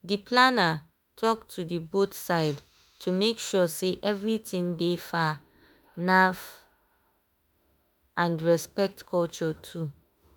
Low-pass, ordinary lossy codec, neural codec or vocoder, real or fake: none; none; autoencoder, 48 kHz, 32 numbers a frame, DAC-VAE, trained on Japanese speech; fake